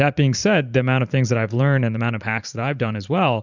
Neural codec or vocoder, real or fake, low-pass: vocoder, 44.1 kHz, 128 mel bands every 512 samples, BigVGAN v2; fake; 7.2 kHz